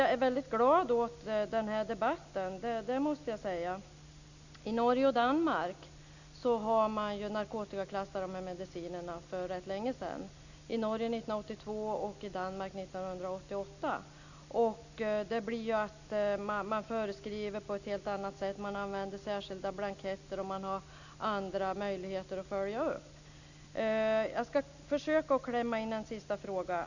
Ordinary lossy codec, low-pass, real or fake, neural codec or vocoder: none; 7.2 kHz; real; none